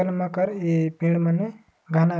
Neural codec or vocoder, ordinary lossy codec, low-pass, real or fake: none; none; none; real